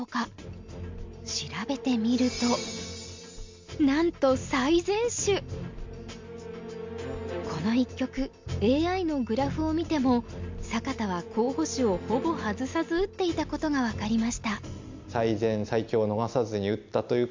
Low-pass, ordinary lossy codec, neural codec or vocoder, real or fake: 7.2 kHz; MP3, 64 kbps; none; real